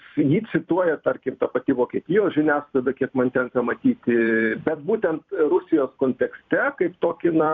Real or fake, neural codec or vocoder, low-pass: fake; vocoder, 44.1 kHz, 128 mel bands every 256 samples, BigVGAN v2; 7.2 kHz